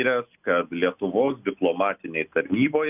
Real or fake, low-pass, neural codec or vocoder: real; 3.6 kHz; none